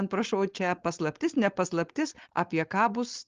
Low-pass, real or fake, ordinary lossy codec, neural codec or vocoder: 7.2 kHz; fake; Opus, 24 kbps; codec, 16 kHz, 4.8 kbps, FACodec